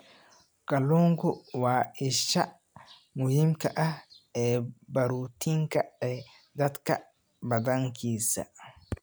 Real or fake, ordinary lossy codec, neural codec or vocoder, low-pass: real; none; none; none